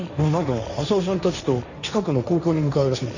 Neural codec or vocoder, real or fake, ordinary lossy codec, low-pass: codec, 16 kHz, 1.1 kbps, Voila-Tokenizer; fake; none; 7.2 kHz